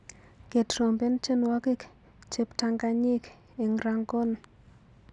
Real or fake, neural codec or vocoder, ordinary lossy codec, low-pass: real; none; none; 10.8 kHz